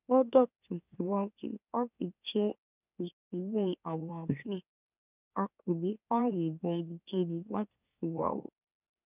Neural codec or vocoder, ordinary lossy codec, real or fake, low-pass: autoencoder, 44.1 kHz, a latent of 192 numbers a frame, MeloTTS; none; fake; 3.6 kHz